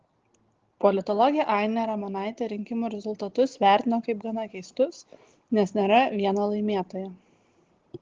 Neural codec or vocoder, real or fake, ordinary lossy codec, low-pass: none; real; Opus, 16 kbps; 7.2 kHz